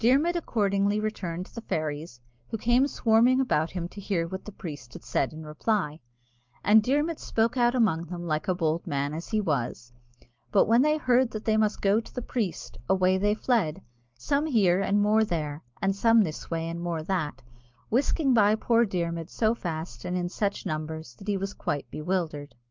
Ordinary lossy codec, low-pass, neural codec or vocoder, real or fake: Opus, 24 kbps; 7.2 kHz; none; real